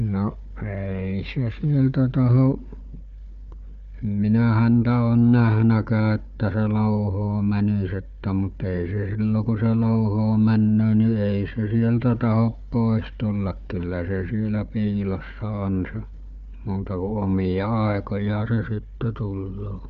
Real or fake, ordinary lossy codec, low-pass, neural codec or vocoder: fake; AAC, 64 kbps; 7.2 kHz; codec, 16 kHz, 4 kbps, FunCodec, trained on Chinese and English, 50 frames a second